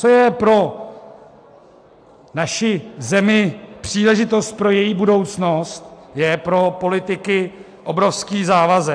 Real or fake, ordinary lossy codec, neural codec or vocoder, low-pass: real; AAC, 64 kbps; none; 9.9 kHz